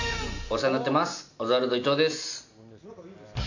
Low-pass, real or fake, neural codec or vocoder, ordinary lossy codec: 7.2 kHz; real; none; none